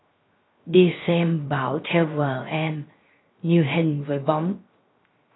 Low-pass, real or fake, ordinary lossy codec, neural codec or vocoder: 7.2 kHz; fake; AAC, 16 kbps; codec, 16 kHz, 0.3 kbps, FocalCodec